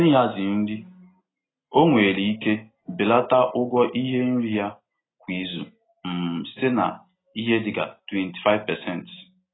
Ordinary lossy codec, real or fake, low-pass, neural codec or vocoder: AAC, 16 kbps; real; 7.2 kHz; none